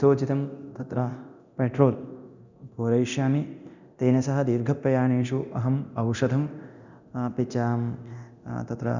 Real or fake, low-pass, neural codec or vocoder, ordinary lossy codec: fake; 7.2 kHz; codec, 24 kHz, 0.9 kbps, DualCodec; none